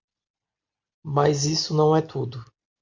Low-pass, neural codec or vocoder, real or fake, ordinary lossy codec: 7.2 kHz; none; real; MP3, 48 kbps